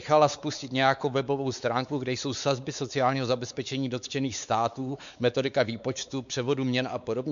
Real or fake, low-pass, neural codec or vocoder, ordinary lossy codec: fake; 7.2 kHz; codec, 16 kHz, 4 kbps, X-Codec, WavLM features, trained on Multilingual LibriSpeech; MP3, 96 kbps